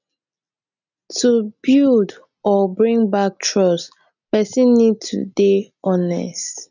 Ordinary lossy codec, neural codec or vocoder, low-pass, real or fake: none; none; 7.2 kHz; real